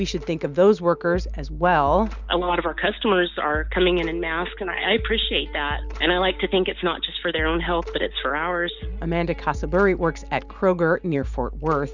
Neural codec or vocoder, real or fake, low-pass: none; real; 7.2 kHz